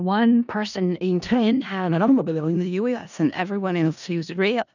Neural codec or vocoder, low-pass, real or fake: codec, 16 kHz in and 24 kHz out, 0.4 kbps, LongCat-Audio-Codec, four codebook decoder; 7.2 kHz; fake